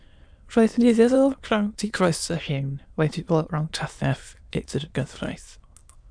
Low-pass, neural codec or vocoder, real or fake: 9.9 kHz; autoencoder, 22.05 kHz, a latent of 192 numbers a frame, VITS, trained on many speakers; fake